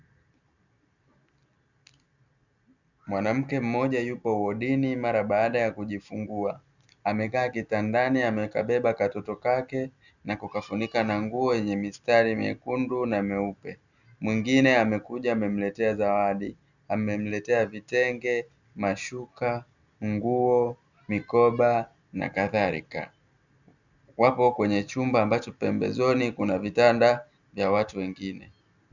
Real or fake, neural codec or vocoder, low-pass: real; none; 7.2 kHz